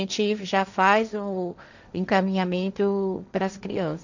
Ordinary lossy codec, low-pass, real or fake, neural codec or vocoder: none; 7.2 kHz; fake; codec, 16 kHz, 1.1 kbps, Voila-Tokenizer